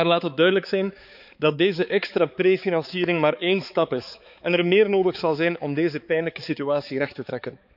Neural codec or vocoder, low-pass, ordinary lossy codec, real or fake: codec, 16 kHz, 4 kbps, X-Codec, HuBERT features, trained on balanced general audio; 5.4 kHz; none; fake